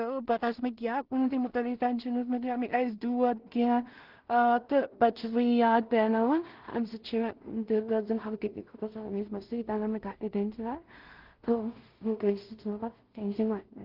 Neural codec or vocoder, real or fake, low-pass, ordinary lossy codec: codec, 16 kHz in and 24 kHz out, 0.4 kbps, LongCat-Audio-Codec, two codebook decoder; fake; 5.4 kHz; Opus, 16 kbps